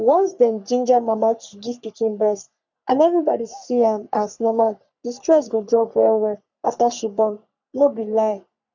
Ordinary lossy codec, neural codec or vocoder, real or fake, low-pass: none; codec, 44.1 kHz, 3.4 kbps, Pupu-Codec; fake; 7.2 kHz